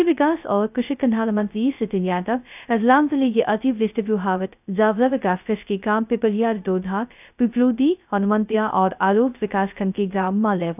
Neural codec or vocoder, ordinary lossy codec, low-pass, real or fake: codec, 16 kHz, 0.2 kbps, FocalCodec; none; 3.6 kHz; fake